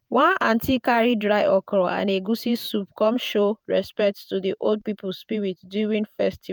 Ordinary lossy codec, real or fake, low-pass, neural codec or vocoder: none; fake; none; vocoder, 48 kHz, 128 mel bands, Vocos